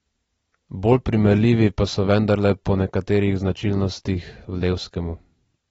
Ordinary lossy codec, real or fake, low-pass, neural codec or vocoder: AAC, 24 kbps; real; 19.8 kHz; none